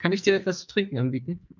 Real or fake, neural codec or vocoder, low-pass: fake; codec, 44.1 kHz, 2.6 kbps, SNAC; 7.2 kHz